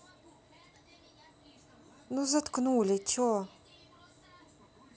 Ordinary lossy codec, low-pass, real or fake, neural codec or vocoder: none; none; real; none